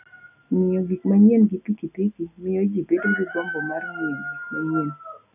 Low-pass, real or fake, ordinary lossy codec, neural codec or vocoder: 3.6 kHz; real; none; none